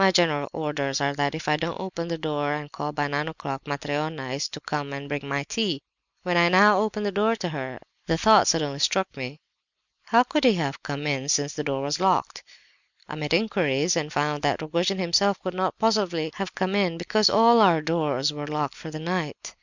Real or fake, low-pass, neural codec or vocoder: real; 7.2 kHz; none